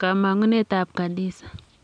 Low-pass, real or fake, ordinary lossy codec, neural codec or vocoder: 9.9 kHz; real; none; none